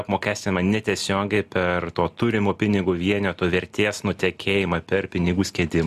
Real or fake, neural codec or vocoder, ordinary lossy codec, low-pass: real; none; Opus, 64 kbps; 14.4 kHz